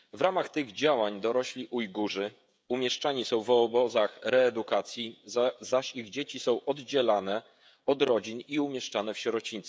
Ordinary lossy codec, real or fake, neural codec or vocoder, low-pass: none; fake; codec, 16 kHz, 16 kbps, FreqCodec, smaller model; none